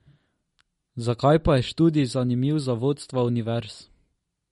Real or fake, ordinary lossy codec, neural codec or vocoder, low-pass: real; MP3, 48 kbps; none; 19.8 kHz